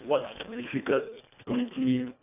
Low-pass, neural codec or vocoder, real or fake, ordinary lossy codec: 3.6 kHz; codec, 24 kHz, 1.5 kbps, HILCodec; fake; none